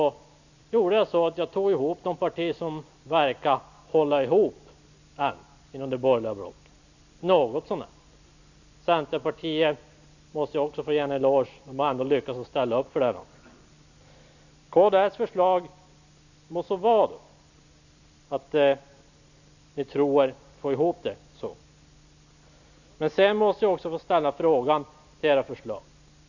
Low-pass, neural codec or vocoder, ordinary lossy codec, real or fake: 7.2 kHz; none; none; real